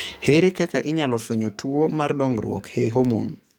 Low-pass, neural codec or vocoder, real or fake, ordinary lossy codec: none; codec, 44.1 kHz, 2.6 kbps, SNAC; fake; none